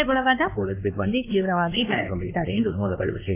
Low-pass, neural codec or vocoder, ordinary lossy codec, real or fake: 3.6 kHz; codec, 16 kHz, 2 kbps, X-Codec, WavLM features, trained on Multilingual LibriSpeech; AAC, 24 kbps; fake